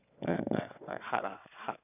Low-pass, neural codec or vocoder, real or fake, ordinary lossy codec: 3.6 kHz; vocoder, 22.05 kHz, 80 mel bands, WaveNeXt; fake; none